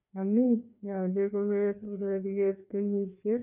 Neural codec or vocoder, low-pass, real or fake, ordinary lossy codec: codec, 16 kHz, 2 kbps, FreqCodec, larger model; 3.6 kHz; fake; none